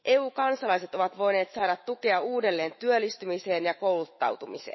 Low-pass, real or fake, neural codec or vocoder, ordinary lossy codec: 7.2 kHz; fake; autoencoder, 48 kHz, 128 numbers a frame, DAC-VAE, trained on Japanese speech; MP3, 24 kbps